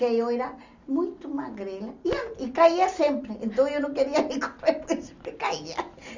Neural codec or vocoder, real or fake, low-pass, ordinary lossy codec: none; real; 7.2 kHz; Opus, 64 kbps